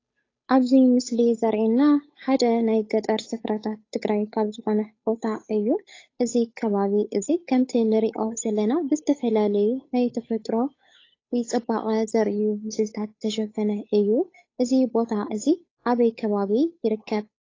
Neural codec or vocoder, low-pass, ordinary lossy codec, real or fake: codec, 16 kHz, 8 kbps, FunCodec, trained on Chinese and English, 25 frames a second; 7.2 kHz; AAC, 32 kbps; fake